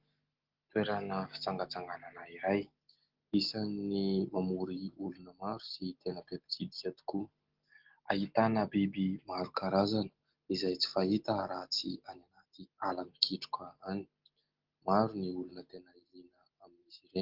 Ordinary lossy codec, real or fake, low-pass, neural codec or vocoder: Opus, 16 kbps; real; 5.4 kHz; none